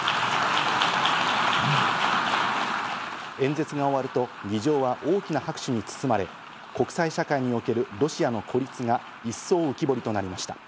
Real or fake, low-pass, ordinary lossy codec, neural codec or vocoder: real; none; none; none